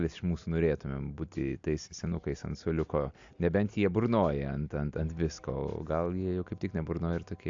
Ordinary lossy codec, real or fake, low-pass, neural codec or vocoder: AAC, 64 kbps; real; 7.2 kHz; none